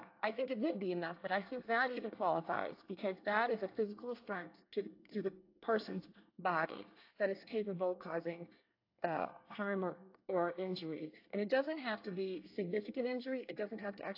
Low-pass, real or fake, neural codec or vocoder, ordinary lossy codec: 5.4 kHz; fake; codec, 24 kHz, 1 kbps, SNAC; AAC, 32 kbps